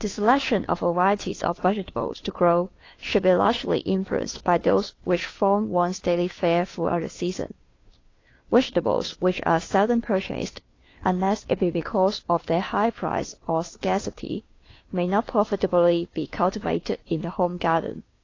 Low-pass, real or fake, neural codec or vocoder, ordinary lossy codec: 7.2 kHz; fake; codec, 16 kHz, 2 kbps, FunCodec, trained on Chinese and English, 25 frames a second; AAC, 32 kbps